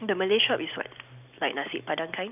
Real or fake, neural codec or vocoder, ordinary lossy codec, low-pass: real; none; none; 3.6 kHz